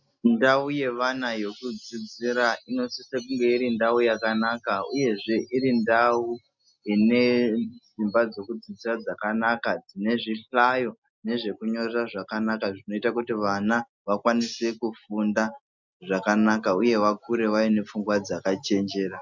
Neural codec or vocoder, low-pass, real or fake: none; 7.2 kHz; real